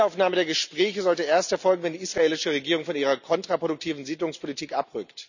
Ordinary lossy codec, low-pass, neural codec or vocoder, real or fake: none; 7.2 kHz; none; real